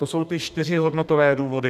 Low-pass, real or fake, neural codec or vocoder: 14.4 kHz; fake; codec, 32 kHz, 1.9 kbps, SNAC